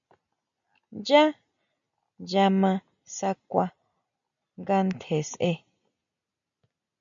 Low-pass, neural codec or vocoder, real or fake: 7.2 kHz; none; real